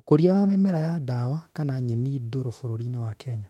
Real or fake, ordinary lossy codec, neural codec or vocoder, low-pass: fake; MP3, 64 kbps; autoencoder, 48 kHz, 32 numbers a frame, DAC-VAE, trained on Japanese speech; 19.8 kHz